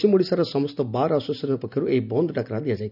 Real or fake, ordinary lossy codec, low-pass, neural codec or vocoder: real; none; 5.4 kHz; none